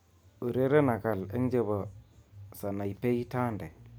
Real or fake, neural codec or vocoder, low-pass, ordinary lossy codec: real; none; none; none